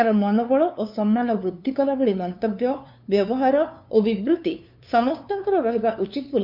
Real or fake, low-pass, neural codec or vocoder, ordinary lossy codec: fake; 5.4 kHz; codec, 16 kHz, 2 kbps, FunCodec, trained on LibriTTS, 25 frames a second; none